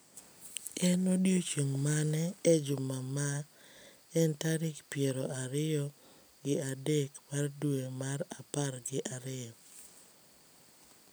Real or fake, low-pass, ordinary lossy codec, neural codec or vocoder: real; none; none; none